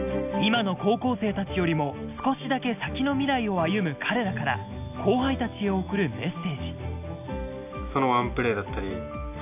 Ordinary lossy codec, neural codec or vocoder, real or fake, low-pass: AAC, 32 kbps; none; real; 3.6 kHz